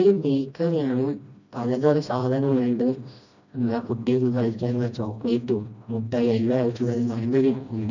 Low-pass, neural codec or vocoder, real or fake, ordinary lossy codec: 7.2 kHz; codec, 16 kHz, 1 kbps, FreqCodec, smaller model; fake; none